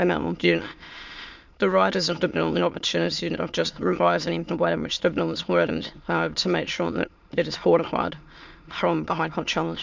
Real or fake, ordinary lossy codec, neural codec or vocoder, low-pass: fake; MP3, 64 kbps; autoencoder, 22.05 kHz, a latent of 192 numbers a frame, VITS, trained on many speakers; 7.2 kHz